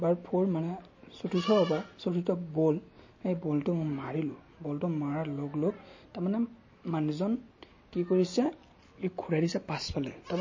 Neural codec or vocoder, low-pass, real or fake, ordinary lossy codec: none; 7.2 kHz; real; MP3, 32 kbps